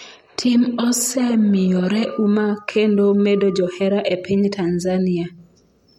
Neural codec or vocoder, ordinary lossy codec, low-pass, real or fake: none; MP3, 64 kbps; 19.8 kHz; real